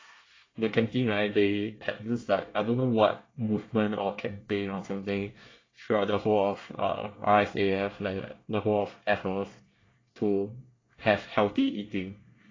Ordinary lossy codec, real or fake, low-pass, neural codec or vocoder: AAC, 32 kbps; fake; 7.2 kHz; codec, 24 kHz, 1 kbps, SNAC